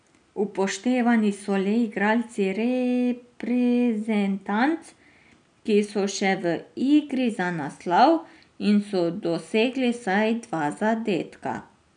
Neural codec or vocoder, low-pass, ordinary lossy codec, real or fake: none; 9.9 kHz; none; real